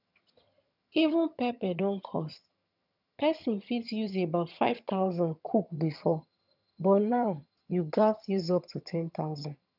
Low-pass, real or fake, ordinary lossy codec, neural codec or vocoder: 5.4 kHz; fake; none; vocoder, 22.05 kHz, 80 mel bands, HiFi-GAN